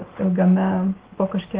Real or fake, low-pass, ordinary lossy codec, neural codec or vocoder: real; 3.6 kHz; Opus, 16 kbps; none